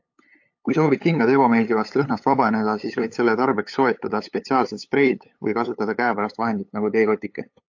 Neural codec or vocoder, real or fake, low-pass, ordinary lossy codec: codec, 16 kHz, 8 kbps, FunCodec, trained on LibriTTS, 25 frames a second; fake; 7.2 kHz; AAC, 48 kbps